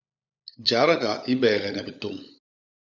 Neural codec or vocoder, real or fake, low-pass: codec, 16 kHz, 16 kbps, FunCodec, trained on LibriTTS, 50 frames a second; fake; 7.2 kHz